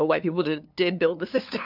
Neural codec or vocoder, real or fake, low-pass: codec, 16 kHz, 2 kbps, FunCodec, trained on LibriTTS, 25 frames a second; fake; 5.4 kHz